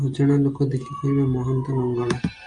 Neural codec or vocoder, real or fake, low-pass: none; real; 9.9 kHz